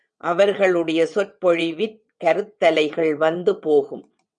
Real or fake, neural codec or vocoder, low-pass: fake; vocoder, 22.05 kHz, 80 mel bands, WaveNeXt; 9.9 kHz